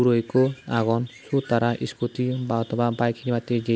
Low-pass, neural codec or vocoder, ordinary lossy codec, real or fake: none; none; none; real